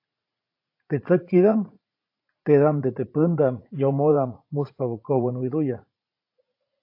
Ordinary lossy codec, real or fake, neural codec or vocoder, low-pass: AAC, 32 kbps; fake; vocoder, 44.1 kHz, 80 mel bands, Vocos; 5.4 kHz